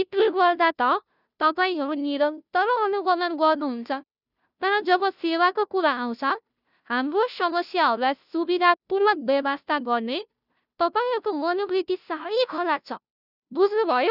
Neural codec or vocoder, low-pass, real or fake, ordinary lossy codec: codec, 16 kHz, 0.5 kbps, FunCodec, trained on Chinese and English, 25 frames a second; 5.4 kHz; fake; none